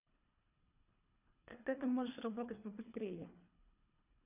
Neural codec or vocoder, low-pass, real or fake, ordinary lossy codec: codec, 24 kHz, 3 kbps, HILCodec; 3.6 kHz; fake; none